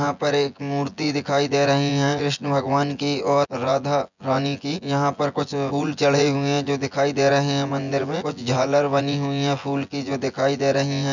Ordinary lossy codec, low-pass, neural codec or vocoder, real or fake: none; 7.2 kHz; vocoder, 24 kHz, 100 mel bands, Vocos; fake